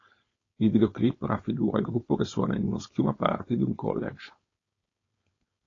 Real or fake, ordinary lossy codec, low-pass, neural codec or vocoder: fake; AAC, 32 kbps; 7.2 kHz; codec, 16 kHz, 4.8 kbps, FACodec